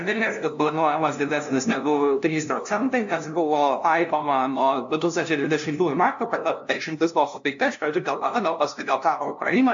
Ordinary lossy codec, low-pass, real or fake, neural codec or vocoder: AAC, 64 kbps; 7.2 kHz; fake; codec, 16 kHz, 0.5 kbps, FunCodec, trained on LibriTTS, 25 frames a second